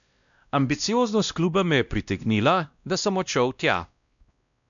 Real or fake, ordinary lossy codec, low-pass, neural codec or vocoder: fake; none; 7.2 kHz; codec, 16 kHz, 1 kbps, X-Codec, WavLM features, trained on Multilingual LibriSpeech